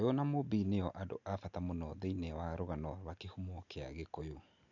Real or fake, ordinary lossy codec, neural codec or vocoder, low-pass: real; none; none; 7.2 kHz